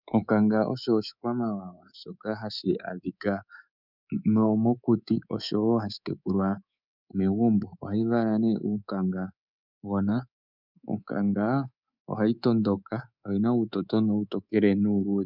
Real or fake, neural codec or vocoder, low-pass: fake; codec, 24 kHz, 3.1 kbps, DualCodec; 5.4 kHz